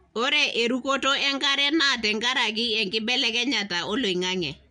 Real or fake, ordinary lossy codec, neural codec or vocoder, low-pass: real; MP3, 64 kbps; none; 9.9 kHz